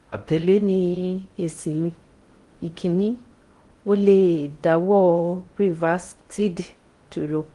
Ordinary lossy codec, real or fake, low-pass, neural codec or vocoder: Opus, 24 kbps; fake; 10.8 kHz; codec, 16 kHz in and 24 kHz out, 0.6 kbps, FocalCodec, streaming, 4096 codes